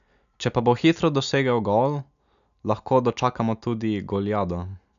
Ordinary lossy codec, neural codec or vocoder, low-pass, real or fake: none; none; 7.2 kHz; real